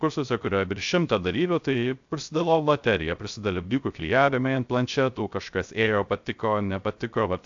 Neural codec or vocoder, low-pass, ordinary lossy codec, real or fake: codec, 16 kHz, 0.3 kbps, FocalCodec; 7.2 kHz; AAC, 64 kbps; fake